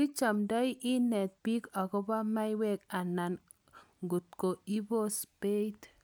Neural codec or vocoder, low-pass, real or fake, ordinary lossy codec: none; none; real; none